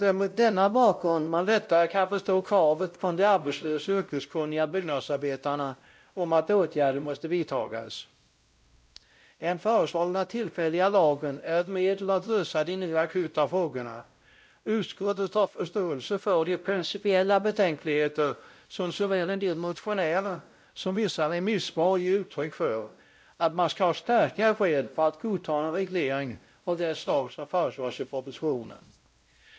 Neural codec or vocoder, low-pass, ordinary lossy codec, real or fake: codec, 16 kHz, 0.5 kbps, X-Codec, WavLM features, trained on Multilingual LibriSpeech; none; none; fake